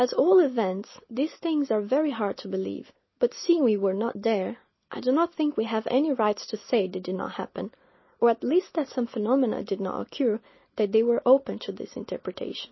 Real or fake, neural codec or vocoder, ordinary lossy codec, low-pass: real; none; MP3, 24 kbps; 7.2 kHz